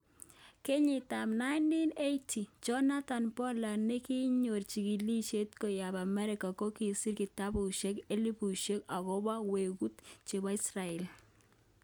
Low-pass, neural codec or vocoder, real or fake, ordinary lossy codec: none; none; real; none